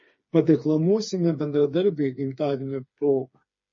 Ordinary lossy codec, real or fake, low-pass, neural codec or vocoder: MP3, 32 kbps; fake; 7.2 kHz; codec, 16 kHz, 4 kbps, FreqCodec, smaller model